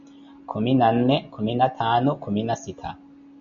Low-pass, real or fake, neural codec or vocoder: 7.2 kHz; real; none